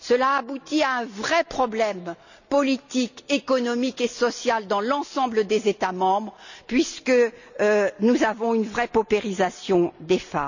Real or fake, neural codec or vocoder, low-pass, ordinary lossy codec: real; none; 7.2 kHz; none